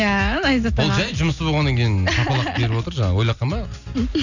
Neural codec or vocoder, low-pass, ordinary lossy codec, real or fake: none; 7.2 kHz; none; real